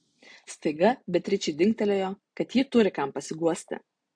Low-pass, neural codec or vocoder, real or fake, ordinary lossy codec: 9.9 kHz; none; real; AAC, 64 kbps